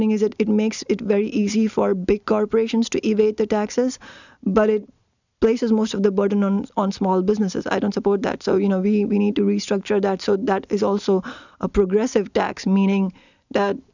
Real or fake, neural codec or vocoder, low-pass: real; none; 7.2 kHz